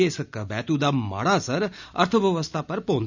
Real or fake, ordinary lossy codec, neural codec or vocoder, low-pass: real; none; none; 7.2 kHz